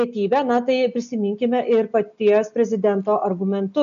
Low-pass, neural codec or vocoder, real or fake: 7.2 kHz; none; real